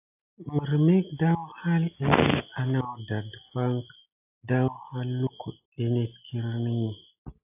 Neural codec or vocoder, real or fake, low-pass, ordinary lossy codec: none; real; 3.6 kHz; AAC, 32 kbps